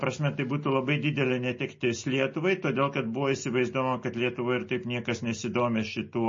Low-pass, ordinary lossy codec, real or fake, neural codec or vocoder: 7.2 kHz; MP3, 32 kbps; real; none